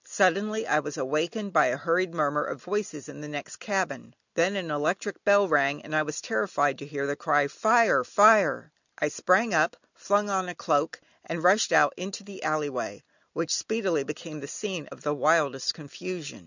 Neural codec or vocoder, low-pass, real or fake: none; 7.2 kHz; real